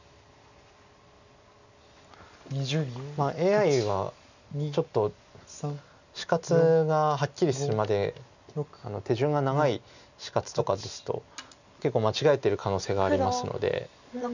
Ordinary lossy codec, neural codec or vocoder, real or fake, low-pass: none; none; real; 7.2 kHz